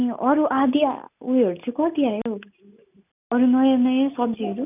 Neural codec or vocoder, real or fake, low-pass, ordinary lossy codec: none; real; 3.6 kHz; none